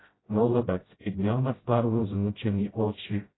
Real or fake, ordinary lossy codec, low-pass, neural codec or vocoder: fake; AAC, 16 kbps; 7.2 kHz; codec, 16 kHz, 0.5 kbps, FreqCodec, smaller model